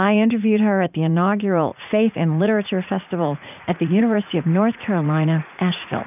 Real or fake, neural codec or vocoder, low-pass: fake; vocoder, 44.1 kHz, 80 mel bands, Vocos; 3.6 kHz